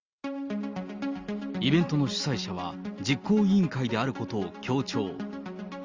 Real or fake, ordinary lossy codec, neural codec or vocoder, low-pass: real; Opus, 32 kbps; none; 7.2 kHz